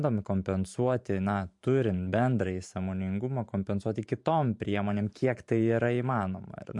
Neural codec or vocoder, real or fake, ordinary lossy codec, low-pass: vocoder, 44.1 kHz, 128 mel bands every 512 samples, BigVGAN v2; fake; MP3, 64 kbps; 10.8 kHz